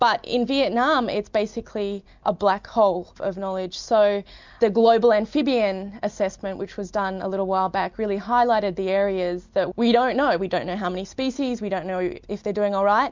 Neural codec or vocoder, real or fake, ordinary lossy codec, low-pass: none; real; MP3, 64 kbps; 7.2 kHz